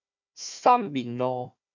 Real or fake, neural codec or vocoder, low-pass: fake; codec, 16 kHz, 1 kbps, FunCodec, trained on Chinese and English, 50 frames a second; 7.2 kHz